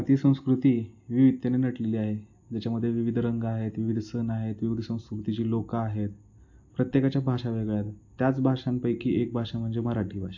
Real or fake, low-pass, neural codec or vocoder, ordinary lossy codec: real; 7.2 kHz; none; AAC, 48 kbps